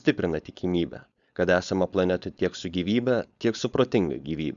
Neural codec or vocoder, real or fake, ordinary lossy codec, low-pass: codec, 16 kHz, 4.8 kbps, FACodec; fake; Opus, 64 kbps; 7.2 kHz